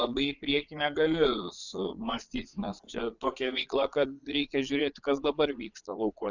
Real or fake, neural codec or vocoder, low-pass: fake; vocoder, 22.05 kHz, 80 mel bands, Vocos; 7.2 kHz